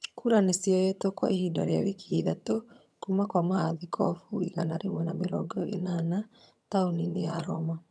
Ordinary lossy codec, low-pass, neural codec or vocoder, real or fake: none; none; vocoder, 22.05 kHz, 80 mel bands, HiFi-GAN; fake